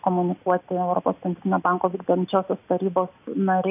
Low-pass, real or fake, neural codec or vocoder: 3.6 kHz; real; none